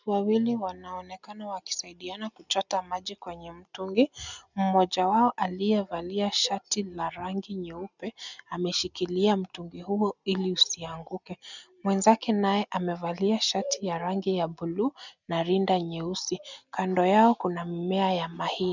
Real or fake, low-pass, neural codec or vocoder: real; 7.2 kHz; none